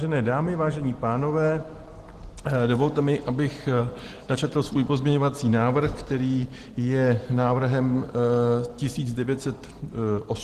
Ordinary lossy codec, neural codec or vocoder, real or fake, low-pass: Opus, 16 kbps; none; real; 14.4 kHz